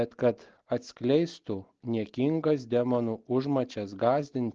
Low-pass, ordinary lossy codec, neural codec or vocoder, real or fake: 7.2 kHz; Opus, 16 kbps; none; real